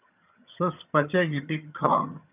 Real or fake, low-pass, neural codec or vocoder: fake; 3.6 kHz; vocoder, 22.05 kHz, 80 mel bands, HiFi-GAN